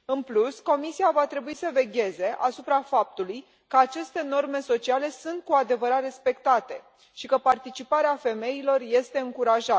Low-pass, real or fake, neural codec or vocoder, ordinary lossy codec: none; real; none; none